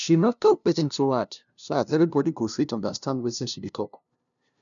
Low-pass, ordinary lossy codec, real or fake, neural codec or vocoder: 7.2 kHz; none; fake; codec, 16 kHz, 0.5 kbps, FunCodec, trained on LibriTTS, 25 frames a second